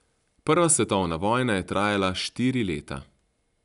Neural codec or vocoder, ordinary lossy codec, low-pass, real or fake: none; none; 10.8 kHz; real